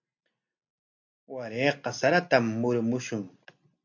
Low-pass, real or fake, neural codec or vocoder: 7.2 kHz; real; none